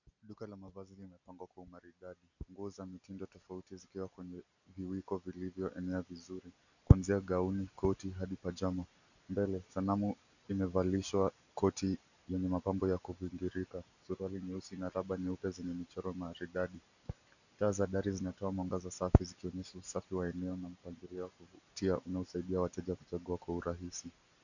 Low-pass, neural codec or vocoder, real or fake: 7.2 kHz; none; real